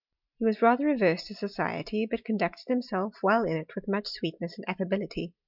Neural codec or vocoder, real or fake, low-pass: none; real; 5.4 kHz